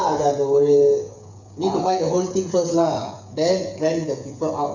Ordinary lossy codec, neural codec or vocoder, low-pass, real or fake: none; codec, 16 kHz, 8 kbps, FreqCodec, smaller model; 7.2 kHz; fake